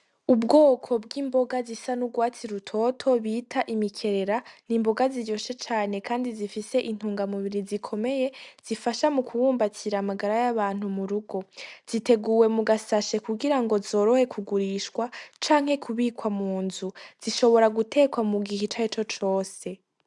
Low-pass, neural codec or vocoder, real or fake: 10.8 kHz; none; real